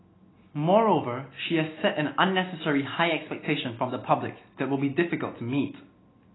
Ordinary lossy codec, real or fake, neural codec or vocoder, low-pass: AAC, 16 kbps; real; none; 7.2 kHz